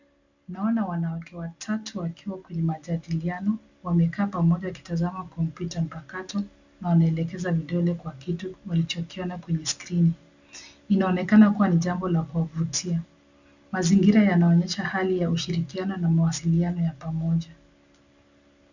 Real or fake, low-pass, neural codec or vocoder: real; 7.2 kHz; none